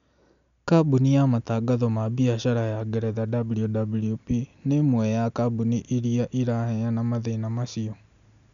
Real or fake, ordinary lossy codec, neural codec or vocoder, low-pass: real; MP3, 96 kbps; none; 7.2 kHz